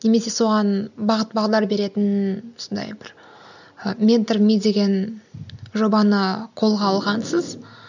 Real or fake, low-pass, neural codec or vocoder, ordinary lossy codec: real; 7.2 kHz; none; none